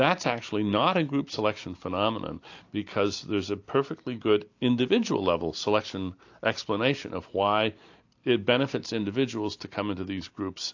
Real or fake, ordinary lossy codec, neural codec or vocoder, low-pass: real; AAC, 48 kbps; none; 7.2 kHz